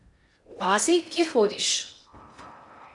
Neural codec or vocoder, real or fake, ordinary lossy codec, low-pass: codec, 16 kHz in and 24 kHz out, 0.6 kbps, FocalCodec, streaming, 4096 codes; fake; MP3, 64 kbps; 10.8 kHz